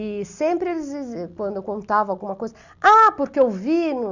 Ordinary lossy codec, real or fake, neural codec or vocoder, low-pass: Opus, 64 kbps; real; none; 7.2 kHz